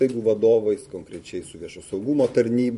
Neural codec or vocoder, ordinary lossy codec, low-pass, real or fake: vocoder, 44.1 kHz, 128 mel bands every 512 samples, BigVGAN v2; MP3, 48 kbps; 14.4 kHz; fake